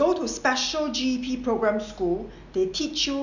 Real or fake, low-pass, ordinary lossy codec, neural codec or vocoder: real; 7.2 kHz; none; none